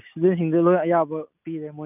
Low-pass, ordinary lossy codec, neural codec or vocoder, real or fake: 3.6 kHz; none; none; real